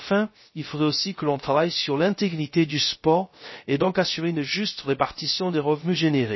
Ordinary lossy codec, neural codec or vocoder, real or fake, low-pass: MP3, 24 kbps; codec, 16 kHz, 0.3 kbps, FocalCodec; fake; 7.2 kHz